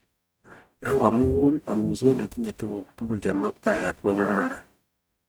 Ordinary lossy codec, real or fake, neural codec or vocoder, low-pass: none; fake; codec, 44.1 kHz, 0.9 kbps, DAC; none